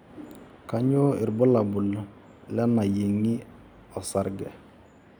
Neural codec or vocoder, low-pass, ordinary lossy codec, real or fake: none; none; none; real